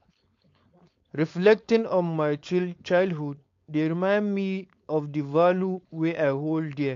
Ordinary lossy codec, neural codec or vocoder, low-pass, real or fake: AAC, 48 kbps; codec, 16 kHz, 4.8 kbps, FACodec; 7.2 kHz; fake